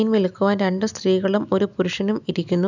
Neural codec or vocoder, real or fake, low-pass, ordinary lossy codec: none; real; 7.2 kHz; none